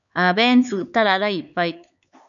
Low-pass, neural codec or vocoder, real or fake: 7.2 kHz; codec, 16 kHz, 4 kbps, X-Codec, HuBERT features, trained on LibriSpeech; fake